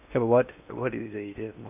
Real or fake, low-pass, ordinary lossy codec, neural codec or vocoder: fake; 3.6 kHz; none; codec, 16 kHz in and 24 kHz out, 0.8 kbps, FocalCodec, streaming, 65536 codes